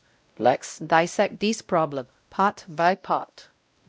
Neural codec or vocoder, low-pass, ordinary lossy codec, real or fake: codec, 16 kHz, 0.5 kbps, X-Codec, WavLM features, trained on Multilingual LibriSpeech; none; none; fake